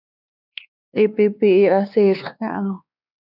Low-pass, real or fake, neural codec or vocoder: 5.4 kHz; fake; codec, 16 kHz, 2 kbps, X-Codec, WavLM features, trained on Multilingual LibriSpeech